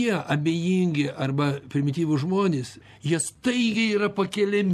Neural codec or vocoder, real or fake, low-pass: none; real; 14.4 kHz